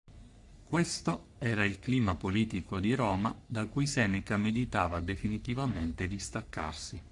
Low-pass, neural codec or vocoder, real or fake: 10.8 kHz; codec, 44.1 kHz, 3.4 kbps, Pupu-Codec; fake